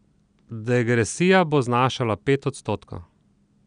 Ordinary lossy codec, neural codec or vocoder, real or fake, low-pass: none; none; real; 9.9 kHz